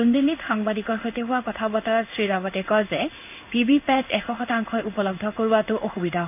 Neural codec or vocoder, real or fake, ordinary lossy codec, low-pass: codec, 16 kHz in and 24 kHz out, 1 kbps, XY-Tokenizer; fake; none; 3.6 kHz